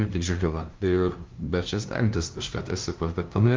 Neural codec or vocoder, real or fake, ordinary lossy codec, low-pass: codec, 16 kHz, 0.5 kbps, FunCodec, trained on LibriTTS, 25 frames a second; fake; Opus, 32 kbps; 7.2 kHz